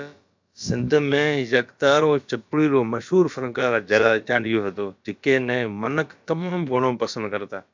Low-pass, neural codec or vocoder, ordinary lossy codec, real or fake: 7.2 kHz; codec, 16 kHz, about 1 kbps, DyCAST, with the encoder's durations; MP3, 64 kbps; fake